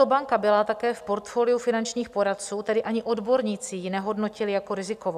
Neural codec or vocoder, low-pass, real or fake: none; 14.4 kHz; real